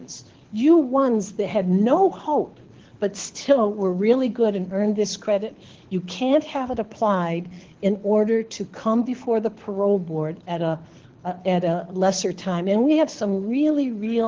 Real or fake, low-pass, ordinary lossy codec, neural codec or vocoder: fake; 7.2 kHz; Opus, 16 kbps; codec, 24 kHz, 6 kbps, HILCodec